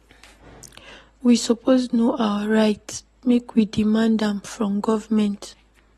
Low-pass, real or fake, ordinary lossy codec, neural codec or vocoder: 19.8 kHz; real; AAC, 32 kbps; none